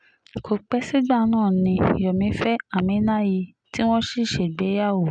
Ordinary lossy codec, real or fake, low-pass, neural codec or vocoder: none; real; 9.9 kHz; none